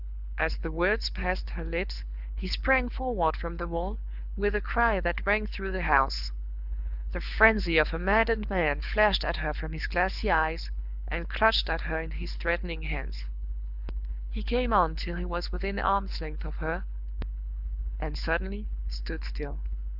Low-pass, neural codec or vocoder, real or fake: 5.4 kHz; codec, 24 kHz, 6 kbps, HILCodec; fake